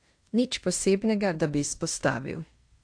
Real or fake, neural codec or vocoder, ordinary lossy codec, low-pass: fake; codec, 16 kHz in and 24 kHz out, 0.9 kbps, LongCat-Audio-Codec, fine tuned four codebook decoder; AAC, 64 kbps; 9.9 kHz